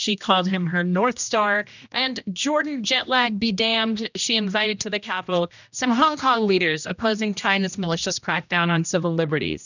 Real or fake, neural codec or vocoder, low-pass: fake; codec, 16 kHz, 1 kbps, X-Codec, HuBERT features, trained on general audio; 7.2 kHz